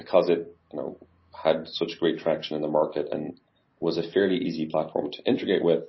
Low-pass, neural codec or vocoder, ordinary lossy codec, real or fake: 7.2 kHz; none; MP3, 24 kbps; real